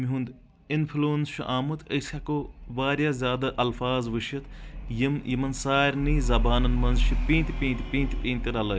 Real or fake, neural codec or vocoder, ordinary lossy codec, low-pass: real; none; none; none